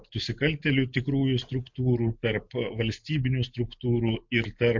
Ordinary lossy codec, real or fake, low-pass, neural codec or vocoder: MP3, 48 kbps; real; 7.2 kHz; none